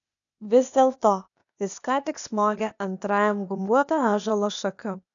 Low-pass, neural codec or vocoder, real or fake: 7.2 kHz; codec, 16 kHz, 0.8 kbps, ZipCodec; fake